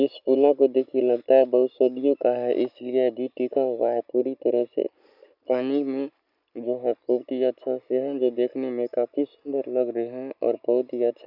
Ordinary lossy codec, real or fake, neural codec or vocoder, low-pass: none; real; none; 5.4 kHz